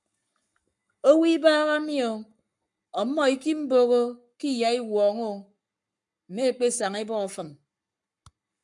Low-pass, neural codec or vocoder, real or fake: 10.8 kHz; codec, 44.1 kHz, 7.8 kbps, Pupu-Codec; fake